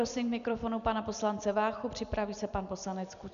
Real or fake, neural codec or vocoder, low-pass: real; none; 7.2 kHz